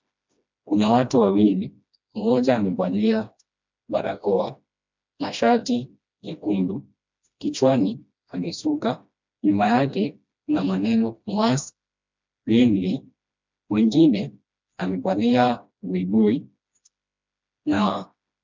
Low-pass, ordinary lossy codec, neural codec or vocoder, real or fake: 7.2 kHz; MP3, 64 kbps; codec, 16 kHz, 1 kbps, FreqCodec, smaller model; fake